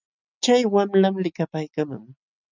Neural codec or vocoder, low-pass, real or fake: none; 7.2 kHz; real